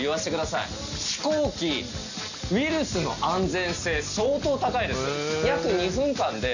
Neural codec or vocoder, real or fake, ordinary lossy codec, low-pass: none; real; none; 7.2 kHz